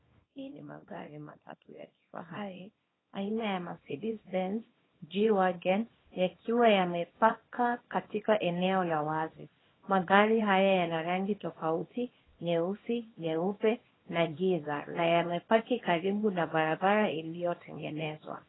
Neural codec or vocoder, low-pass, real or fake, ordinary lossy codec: codec, 24 kHz, 0.9 kbps, WavTokenizer, small release; 7.2 kHz; fake; AAC, 16 kbps